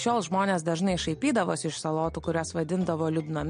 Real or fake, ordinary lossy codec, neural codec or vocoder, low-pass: real; MP3, 48 kbps; none; 9.9 kHz